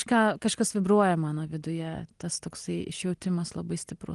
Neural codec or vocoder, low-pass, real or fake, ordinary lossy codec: none; 10.8 kHz; real; Opus, 24 kbps